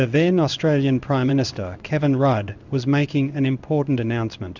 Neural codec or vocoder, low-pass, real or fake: codec, 16 kHz in and 24 kHz out, 1 kbps, XY-Tokenizer; 7.2 kHz; fake